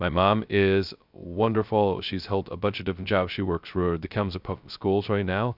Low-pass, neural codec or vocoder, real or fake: 5.4 kHz; codec, 16 kHz, 0.2 kbps, FocalCodec; fake